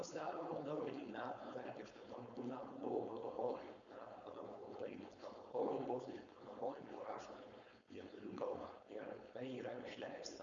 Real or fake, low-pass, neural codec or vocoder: fake; 7.2 kHz; codec, 16 kHz, 4.8 kbps, FACodec